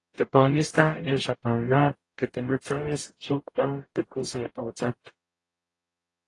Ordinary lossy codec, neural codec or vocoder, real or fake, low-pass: AAC, 32 kbps; codec, 44.1 kHz, 0.9 kbps, DAC; fake; 10.8 kHz